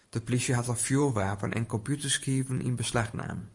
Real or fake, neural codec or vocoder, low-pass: fake; vocoder, 44.1 kHz, 128 mel bands every 512 samples, BigVGAN v2; 10.8 kHz